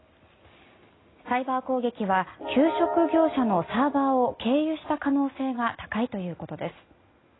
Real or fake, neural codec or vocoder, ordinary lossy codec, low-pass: real; none; AAC, 16 kbps; 7.2 kHz